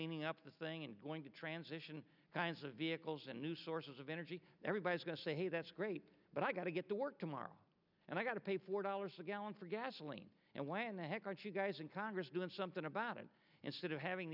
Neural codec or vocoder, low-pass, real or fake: none; 5.4 kHz; real